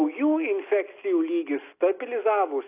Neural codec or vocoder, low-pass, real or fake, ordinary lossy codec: none; 3.6 kHz; real; AAC, 24 kbps